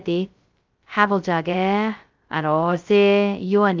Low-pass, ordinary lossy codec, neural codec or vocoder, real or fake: 7.2 kHz; Opus, 24 kbps; codec, 16 kHz, 0.2 kbps, FocalCodec; fake